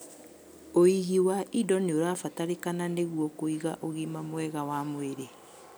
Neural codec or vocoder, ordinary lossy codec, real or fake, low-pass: none; none; real; none